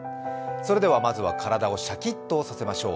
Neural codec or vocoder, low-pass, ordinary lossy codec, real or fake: none; none; none; real